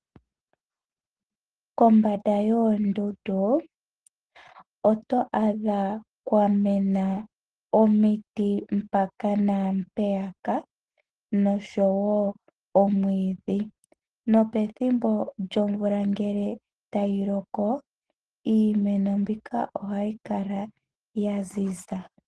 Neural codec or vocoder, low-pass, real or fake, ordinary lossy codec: none; 10.8 kHz; real; Opus, 16 kbps